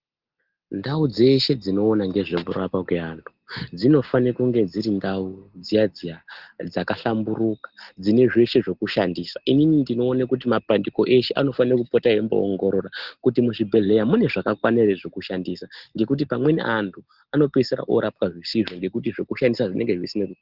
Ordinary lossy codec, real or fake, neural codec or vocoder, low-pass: Opus, 16 kbps; real; none; 5.4 kHz